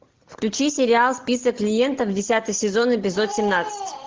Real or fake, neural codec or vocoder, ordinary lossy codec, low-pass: real; none; Opus, 16 kbps; 7.2 kHz